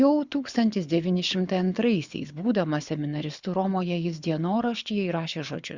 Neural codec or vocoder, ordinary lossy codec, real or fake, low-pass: codec, 24 kHz, 6 kbps, HILCodec; Opus, 64 kbps; fake; 7.2 kHz